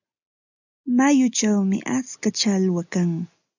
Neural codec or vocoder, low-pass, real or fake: none; 7.2 kHz; real